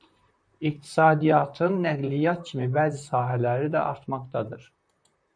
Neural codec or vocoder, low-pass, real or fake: vocoder, 44.1 kHz, 128 mel bands, Pupu-Vocoder; 9.9 kHz; fake